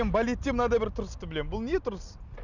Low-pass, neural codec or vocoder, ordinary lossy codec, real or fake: 7.2 kHz; none; none; real